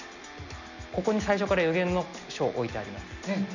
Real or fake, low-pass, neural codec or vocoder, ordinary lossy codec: real; 7.2 kHz; none; none